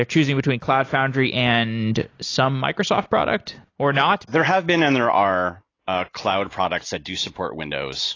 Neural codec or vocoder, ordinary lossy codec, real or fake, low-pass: none; AAC, 32 kbps; real; 7.2 kHz